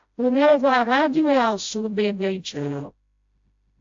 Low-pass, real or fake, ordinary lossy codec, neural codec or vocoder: 7.2 kHz; fake; AAC, 64 kbps; codec, 16 kHz, 0.5 kbps, FreqCodec, smaller model